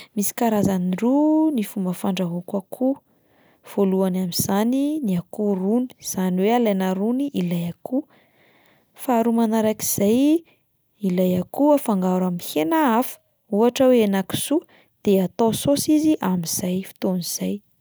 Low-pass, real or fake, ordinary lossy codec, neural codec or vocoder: none; real; none; none